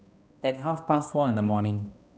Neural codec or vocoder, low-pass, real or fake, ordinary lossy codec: codec, 16 kHz, 1 kbps, X-Codec, HuBERT features, trained on balanced general audio; none; fake; none